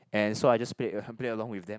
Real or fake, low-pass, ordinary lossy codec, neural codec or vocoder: real; none; none; none